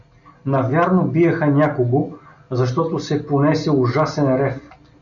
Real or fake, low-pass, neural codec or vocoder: real; 7.2 kHz; none